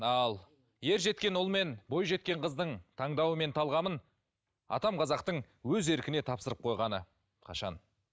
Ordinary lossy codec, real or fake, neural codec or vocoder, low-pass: none; real; none; none